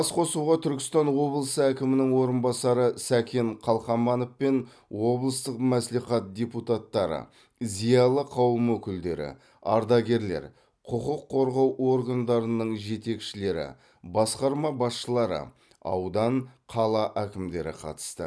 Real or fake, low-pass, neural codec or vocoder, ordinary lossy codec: real; none; none; none